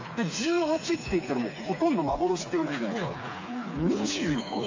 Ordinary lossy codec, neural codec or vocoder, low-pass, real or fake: AAC, 48 kbps; codec, 16 kHz, 4 kbps, FreqCodec, smaller model; 7.2 kHz; fake